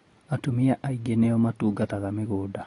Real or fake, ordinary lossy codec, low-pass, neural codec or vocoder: real; AAC, 32 kbps; 10.8 kHz; none